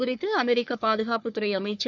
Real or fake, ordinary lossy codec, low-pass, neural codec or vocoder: fake; none; 7.2 kHz; codec, 44.1 kHz, 3.4 kbps, Pupu-Codec